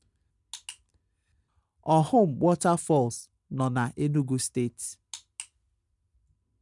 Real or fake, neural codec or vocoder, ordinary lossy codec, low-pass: real; none; none; 10.8 kHz